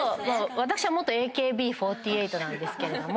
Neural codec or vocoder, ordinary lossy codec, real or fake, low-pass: none; none; real; none